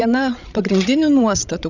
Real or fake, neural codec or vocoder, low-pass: fake; codec, 16 kHz, 16 kbps, FreqCodec, larger model; 7.2 kHz